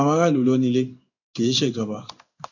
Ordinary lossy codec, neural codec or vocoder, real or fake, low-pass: none; codec, 16 kHz in and 24 kHz out, 1 kbps, XY-Tokenizer; fake; 7.2 kHz